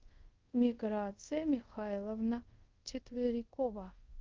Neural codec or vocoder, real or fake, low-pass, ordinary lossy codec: codec, 24 kHz, 0.5 kbps, DualCodec; fake; 7.2 kHz; Opus, 32 kbps